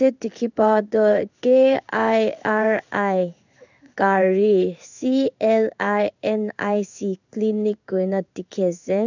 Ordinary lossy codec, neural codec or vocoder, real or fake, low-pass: none; codec, 16 kHz in and 24 kHz out, 1 kbps, XY-Tokenizer; fake; 7.2 kHz